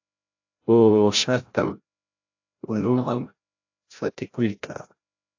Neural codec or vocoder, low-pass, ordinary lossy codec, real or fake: codec, 16 kHz, 0.5 kbps, FreqCodec, larger model; 7.2 kHz; AAC, 48 kbps; fake